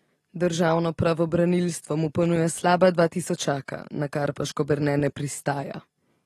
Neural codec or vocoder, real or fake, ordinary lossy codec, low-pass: none; real; AAC, 32 kbps; 19.8 kHz